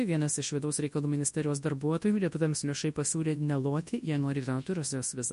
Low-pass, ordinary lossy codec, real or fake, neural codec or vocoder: 10.8 kHz; MP3, 48 kbps; fake; codec, 24 kHz, 0.9 kbps, WavTokenizer, large speech release